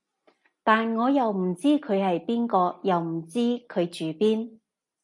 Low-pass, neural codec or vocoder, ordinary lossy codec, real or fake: 10.8 kHz; none; AAC, 64 kbps; real